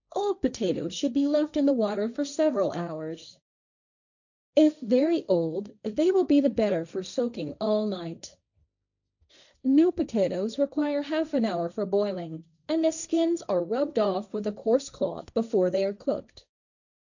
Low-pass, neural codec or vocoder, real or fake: 7.2 kHz; codec, 16 kHz, 1.1 kbps, Voila-Tokenizer; fake